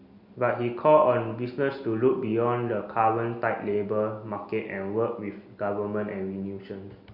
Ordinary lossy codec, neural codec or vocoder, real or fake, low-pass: Opus, 64 kbps; none; real; 5.4 kHz